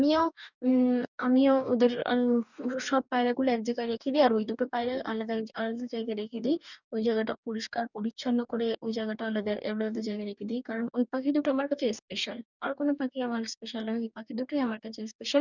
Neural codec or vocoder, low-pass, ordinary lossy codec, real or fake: codec, 44.1 kHz, 2.6 kbps, DAC; 7.2 kHz; none; fake